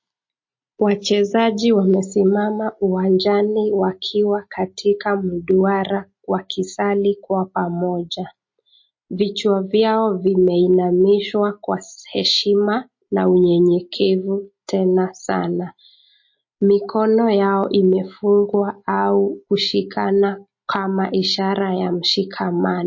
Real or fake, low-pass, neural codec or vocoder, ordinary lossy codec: real; 7.2 kHz; none; MP3, 32 kbps